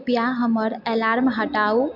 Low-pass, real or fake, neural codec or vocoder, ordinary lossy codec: 5.4 kHz; real; none; MP3, 48 kbps